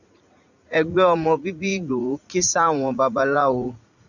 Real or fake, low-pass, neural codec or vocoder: fake; 7.2 kHz; vocoder, 44.1 kHz, 80 mel bands, Vocos